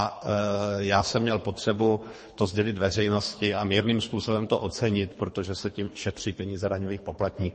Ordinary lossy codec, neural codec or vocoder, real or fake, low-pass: MP3, 32 kbps; codec, 24 kHz, 3 kbps, HILCodec; fake; 10.8 kHz